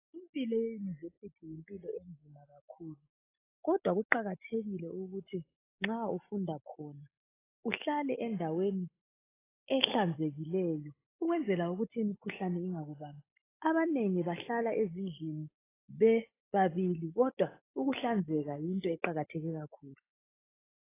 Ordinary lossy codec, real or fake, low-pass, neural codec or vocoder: AAC, 16 kbps; real; 3.6 kHz; none